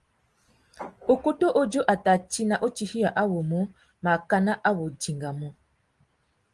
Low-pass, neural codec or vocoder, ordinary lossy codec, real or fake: 10.8 kHz; none; Opus, 24 kbps; real